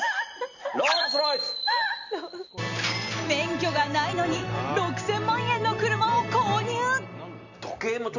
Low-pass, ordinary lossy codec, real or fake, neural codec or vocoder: 7.2 kHz; none; real; none